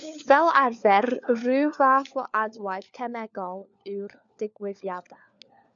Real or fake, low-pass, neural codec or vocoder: fake; 7.2 kHz; codec, 16 kHz, 4 kbps, FunCodec, trained on LibriTTS, 50 frames a second